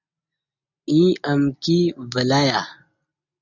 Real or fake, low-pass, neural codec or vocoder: real; 7.2 kHz; none